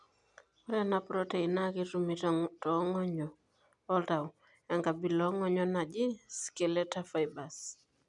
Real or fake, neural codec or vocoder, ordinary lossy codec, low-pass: real; none; none; 10.8 kHz